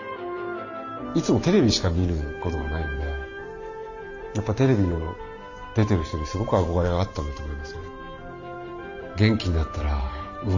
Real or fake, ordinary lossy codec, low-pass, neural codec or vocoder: fake; none; 7.2 kHz; vocoder, 44.1 kHz, 128 mel bands every 512 samples, BigVGAN v2